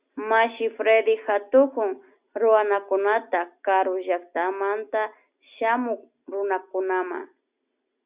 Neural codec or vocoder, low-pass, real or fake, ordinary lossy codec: none; 3.6 kHz; real; Opus, 64 kbps